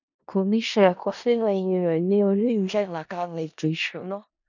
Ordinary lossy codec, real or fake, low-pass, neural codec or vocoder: none; fake; 7.2 kHz; codec, 16 kHz in and 24 kHz out, 0.4 kbps, LongCat-Audio-Codec, four codebook decoder